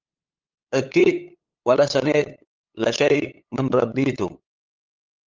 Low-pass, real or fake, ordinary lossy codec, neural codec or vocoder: 7.2 kHz; fake; Opus, 24 kbps; codec, 16 kHz, 8 kbps, FunCodec, trained on LibriTTS, 25 frames a second